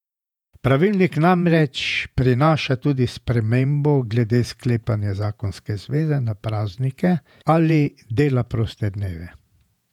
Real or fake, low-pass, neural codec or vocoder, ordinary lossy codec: fake; 19.8 kHz; vocoder, 48 kHz, 128 mel bands, Vocos; none